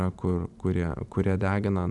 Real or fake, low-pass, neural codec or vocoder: fake; 10.8 kHz; vocoder, 44.1 kHz, 128 mel bands every 512 samples, BigVGAN v2